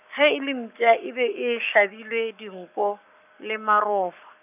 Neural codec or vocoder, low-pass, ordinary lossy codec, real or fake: codec, 44.1 kHz, 7.8 kbps, Pupu-Codec; 3.6 kHz; none; fake